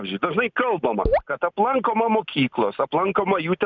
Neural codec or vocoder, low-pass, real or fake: none; 7.2 kHz; real